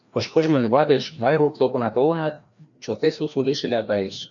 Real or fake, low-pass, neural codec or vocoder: fake; 7.2 kHz; codec, 16 kHz, 1 kbps, FreqCodec, larger model